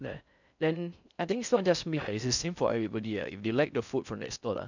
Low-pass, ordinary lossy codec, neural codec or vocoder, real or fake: 7.2 kHz; none; codec, 16 kHz in and 24 kHz out, 0.6 kbps, FocalCodec, streaming, 4096 codes; fake